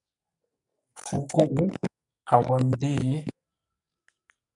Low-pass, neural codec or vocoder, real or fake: 10.8 kHz; codec, 44.1 kHz, 2.6 kbps, SNAC; fake